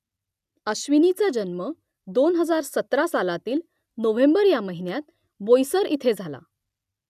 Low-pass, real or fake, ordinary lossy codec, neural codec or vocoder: 14.4 kHz; real; none; none